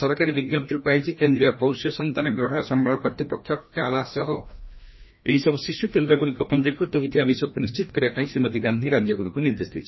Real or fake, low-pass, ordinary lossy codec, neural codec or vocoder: fake; 7.2 kHz; MP3, 24 kbps; codec, 16 kHz, 1 kbps, FreqCodec, larger model